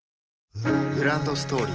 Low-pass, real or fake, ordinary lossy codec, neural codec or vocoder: 7.2 kHz; real; Opus, 16 kbps; none